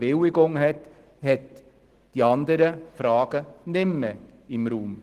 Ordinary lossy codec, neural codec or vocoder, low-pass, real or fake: Opus, 16 kbps; none; 14.4 kHz; real